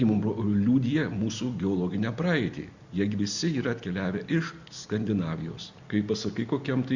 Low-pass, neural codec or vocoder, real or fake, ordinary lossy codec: 7.2 kHz; none; real; Opus, 64 kbps